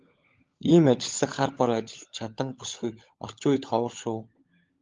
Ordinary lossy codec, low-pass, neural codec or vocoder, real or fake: Opus, 32 kbps; 7.2 kHz; codec, 16 kHz, 16 kbps, FunCodec, trained on LibriTTS, 50 frames a second; fake